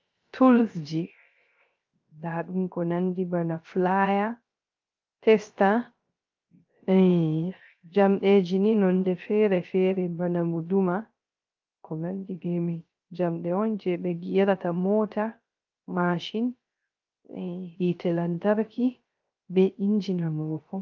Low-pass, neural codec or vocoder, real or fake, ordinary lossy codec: 7.2 kHz; codec, 16 kHz, 0.3 kbps, FocalCodec; fake; Opus, 24 kbps